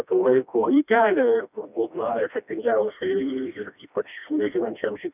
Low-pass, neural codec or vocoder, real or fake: 3.6 kHz; codec, 16 kHz, 1 kbps, FreqCodec, smaller model; fake